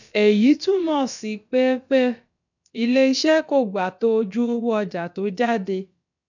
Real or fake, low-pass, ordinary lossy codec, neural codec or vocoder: fake; 7.2 kHz; none; codec, 16 kHz, about 1 kbps, DyCAST, with the encoder's durations